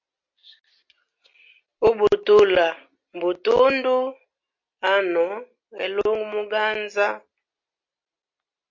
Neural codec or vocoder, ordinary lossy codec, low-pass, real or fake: none; MP3, 48 kbps; 7.2 kHz; real